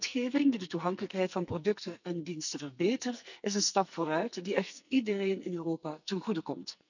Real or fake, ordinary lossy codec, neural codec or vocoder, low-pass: fake; none; codec, 44.1 kHz, 2.6 kbps, SNAC; 7.2 kHz